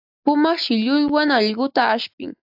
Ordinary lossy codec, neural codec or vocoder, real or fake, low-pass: AAC, 48 kbps; none; real; 5.4 kHz